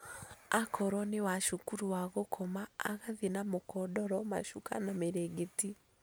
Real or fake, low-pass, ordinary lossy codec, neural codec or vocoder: real; none; none; none